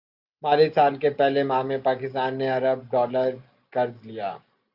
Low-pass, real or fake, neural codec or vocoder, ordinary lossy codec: 5.4 kHz; real; none; Opus, 64 kbps